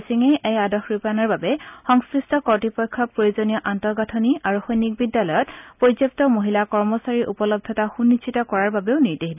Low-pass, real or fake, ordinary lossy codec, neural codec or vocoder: 3.6 kHz; real; none; none